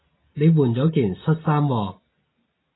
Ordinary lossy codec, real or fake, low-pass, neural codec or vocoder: AAC, 16 kbps; real; 7.2 kHz; none